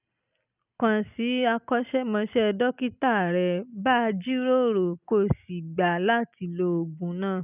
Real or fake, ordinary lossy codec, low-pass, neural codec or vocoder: real; none; 3.6 kHz; none